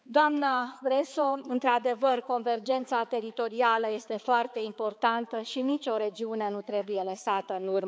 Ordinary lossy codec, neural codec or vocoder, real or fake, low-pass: none; codec, 16 kHz, 4 kbps, X-Codec, HuBERT features, trained on balanced general audio; fake; none